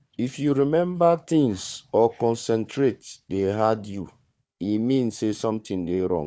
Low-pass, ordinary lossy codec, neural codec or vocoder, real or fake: none; none; codec, 16 kHz, 4 kbps, FunCodec, trained on Chinese and English, 50 frames a second; fake